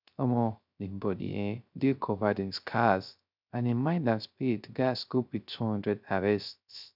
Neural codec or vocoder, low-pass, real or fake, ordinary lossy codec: codec, 16 kHz, 0.3 kbps, FocalCodec; 5.4 kHz; fake; none